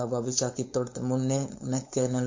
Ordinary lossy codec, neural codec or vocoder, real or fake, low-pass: MP3, 48 kbps; codec, 16 kHz, 4.8 kbps, FACodec; fake; 7.2 kHz